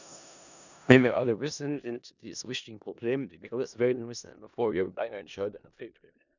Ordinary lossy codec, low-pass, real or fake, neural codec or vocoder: none; 7.2 kHz; fake; codec, 16 kHz in and 24 kHz out, 0.4 kbps, LongCat-Audio-Codec, four codebook decoder